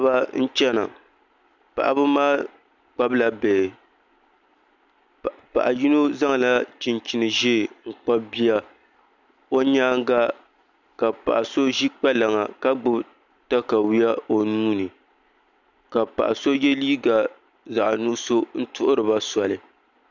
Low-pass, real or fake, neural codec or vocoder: 7.2 kHz; real; none